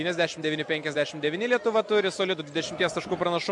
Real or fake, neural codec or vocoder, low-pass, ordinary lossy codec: real; none; 10.8 kHz; MP3, 64 kbps